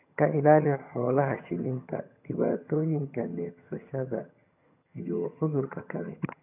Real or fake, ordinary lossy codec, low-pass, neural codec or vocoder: fake; none; 3.6 kHz; vocoder, 22.05 kHz, 80 mel bands, HiFi-GAN